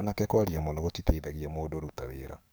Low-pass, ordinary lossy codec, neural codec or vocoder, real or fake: none; none; codec, 44.1 kHz, 7.8 kbps, DAC; fake